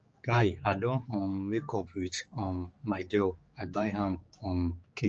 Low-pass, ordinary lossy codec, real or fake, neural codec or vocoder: 7.2 kHz; Opus, 24 kbps; fake; codec, 16 kHz, 4 kbps, X-Codec, HuBERT features, trained on general audio